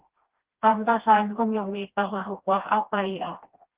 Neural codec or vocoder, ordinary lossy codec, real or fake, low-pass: codec, 16 kHz, 1 kbps, FreqCodec, smaller model; Opus, 16 kbps; fake; 3.6 kHz